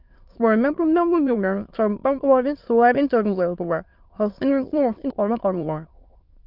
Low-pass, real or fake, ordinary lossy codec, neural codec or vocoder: 5.4 kHz; fake; Opus, 24 kbps; autoencoder, 22.05 kHz, a latent of 192 numbers a frame, VITS, trained on many speakers